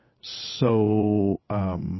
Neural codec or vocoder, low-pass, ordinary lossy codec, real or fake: vocoder, 22.05 kHz, 80 mel bands, WaveNeXt; 7.2 kHz; MP3, 24 kbps; fake